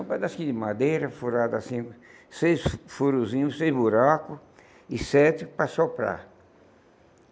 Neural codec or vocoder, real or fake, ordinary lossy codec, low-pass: none; real; none; none